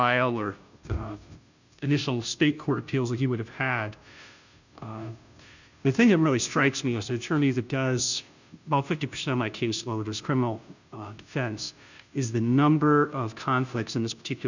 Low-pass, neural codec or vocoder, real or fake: 7.2 kHz; codec, 16 kHz, 0.5 kbps, FunCodec, trained on Chinese and English, 25 frames a second; fake